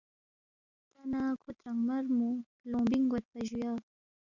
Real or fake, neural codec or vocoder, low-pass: real; none; 7.2 kHz